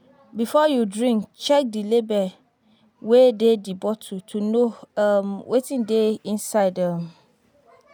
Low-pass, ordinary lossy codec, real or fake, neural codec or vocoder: none; none; real; none